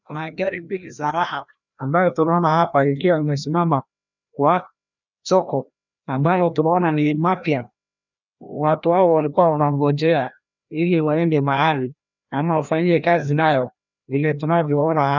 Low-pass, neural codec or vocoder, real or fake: 7.2 kHz; codec, 16 kHz, 1 kbps, FreqCodec, larger model; fake